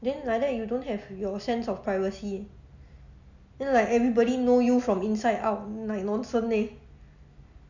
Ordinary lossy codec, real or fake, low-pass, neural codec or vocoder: none; real; 7.2 kHz; none